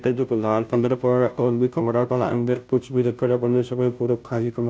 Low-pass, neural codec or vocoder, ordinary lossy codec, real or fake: none; codec, 16 kHz, 0.5 kbps, FunCodec, trained on Chinese and English, 25 frames a second; none; fake